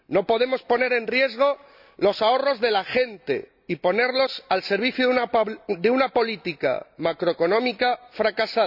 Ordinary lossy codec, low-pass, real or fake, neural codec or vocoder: none; 5.4 kHz; real; none